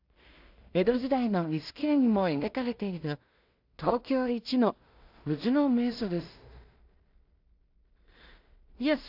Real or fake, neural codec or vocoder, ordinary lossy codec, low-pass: fake; codec, 16 kHz in and 24 kHz out, 0.4 kbps, LongCat-Audio-Codec, two codebook decoder; AAC, 48 kbps; 5.4 kHz